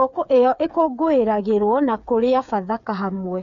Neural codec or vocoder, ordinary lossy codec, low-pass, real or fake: codec, 16 kHz, 8 kbps, FreqCodec, smaller model; none; 7.2 kHz; fake